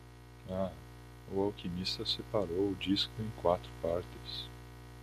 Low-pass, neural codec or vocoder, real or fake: 14.4 kHz; none; real